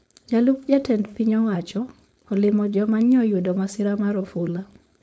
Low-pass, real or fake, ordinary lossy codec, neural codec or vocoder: none; fake; none; codec, 16 kHz, 4.8 kbps, FACodec